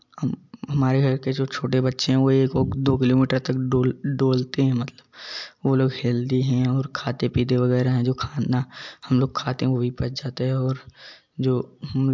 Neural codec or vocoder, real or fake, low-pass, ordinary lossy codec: none; real; 7.2 kHz; MP3, 64 kbps